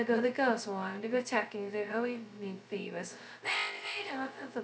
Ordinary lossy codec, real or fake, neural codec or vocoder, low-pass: none; fake; codec, 16 kHz, 0.2 kbps, FocalCodec; none